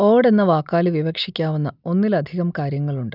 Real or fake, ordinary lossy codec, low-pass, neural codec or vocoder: real; none; 5.4 kHz; none